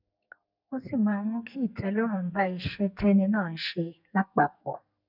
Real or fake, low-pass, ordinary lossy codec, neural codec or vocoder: fake; 5.4 kHz; none; codec, 44.1 kHz, 2.6 kbps, SNAC